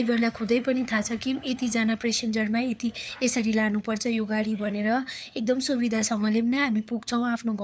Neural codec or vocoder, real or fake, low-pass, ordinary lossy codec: codec, 16 kHz, 4 kbps, FunCodec, trained on LibriTTS, 50 frames a second; fake; none; none